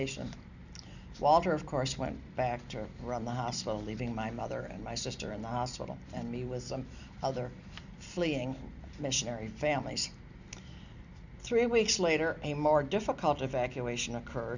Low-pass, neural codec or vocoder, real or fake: 7.2 kHz; none; real